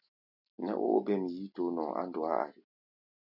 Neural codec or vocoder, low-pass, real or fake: none; 5.4 kHz; real